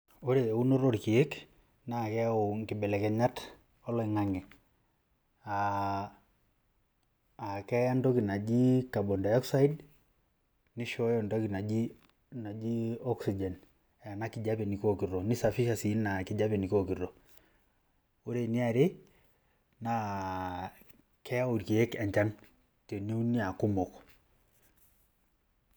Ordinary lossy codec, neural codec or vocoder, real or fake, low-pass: none; none; real; none